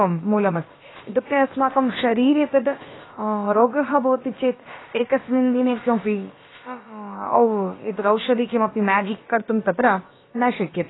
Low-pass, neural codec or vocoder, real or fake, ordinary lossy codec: 7.2 kHz; codec, 16 kHz, about 1 kbps, DyCAST, with the encoder's durations; fake; AAC, 16 kbps